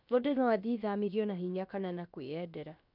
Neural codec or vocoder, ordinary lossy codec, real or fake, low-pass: codec, 16 kHz, about 1 kbps, DyCAST, with the encoder's durations; none; fake; 5.4 kHz